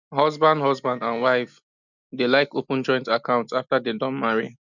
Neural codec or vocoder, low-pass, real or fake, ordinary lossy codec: none; 7.2 kHz; real; none